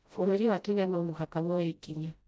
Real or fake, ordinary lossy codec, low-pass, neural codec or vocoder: fake; none; none; codec, 16 kHz, 0.5 kbps, FreqCodec, smaller model